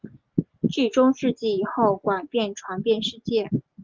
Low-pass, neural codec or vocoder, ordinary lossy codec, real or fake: 7.2 kHz; none; Opus, 32 kbps; real